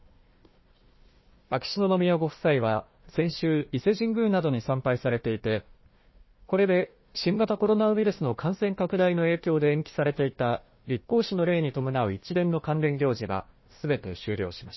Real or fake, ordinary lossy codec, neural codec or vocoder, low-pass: fake; MP3, 24 kbps; codec, 16 kHz, 1 kbps, FunCodec, trained on Chinese and English, 50 frames a second; 7.2 kHz